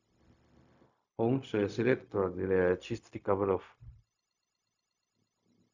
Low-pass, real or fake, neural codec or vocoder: 7.2 kHz; fake; codec, 16 kHz, 0.4 kbps, LongCat-Audio-Codec